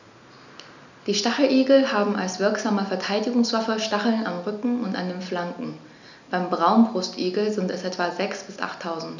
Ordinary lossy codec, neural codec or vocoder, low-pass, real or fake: none; none; 7.2 kHz; real